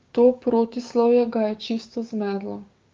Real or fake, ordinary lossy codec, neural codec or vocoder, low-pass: fake; Opus, 32 kbps; codec, 16 kHz, 6 kbps, DAC; 7.2 kHz